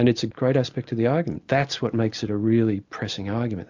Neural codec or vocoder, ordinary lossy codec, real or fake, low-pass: none; MP3, 64 kbps; real; 7.2 kHz